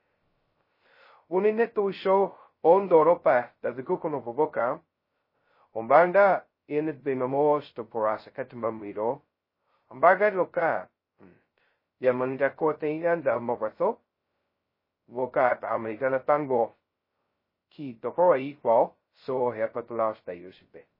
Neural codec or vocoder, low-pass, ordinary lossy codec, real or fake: codec, 16 kHz, 0.2 kbps, FocalCodec; 5.4 kHz; MP3, 24 kbps; fake